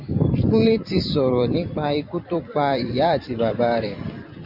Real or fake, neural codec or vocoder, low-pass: fake; vocoder, 44.1 kHz, 128 mel bands every 256 samples, BigVGAN v2; 5.4 kHz